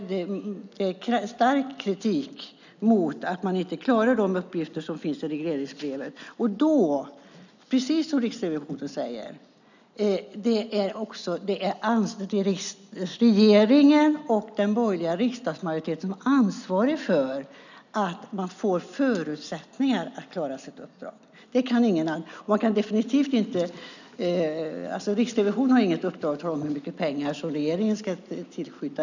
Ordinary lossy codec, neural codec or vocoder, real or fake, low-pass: none; none; real; 7.2 kHz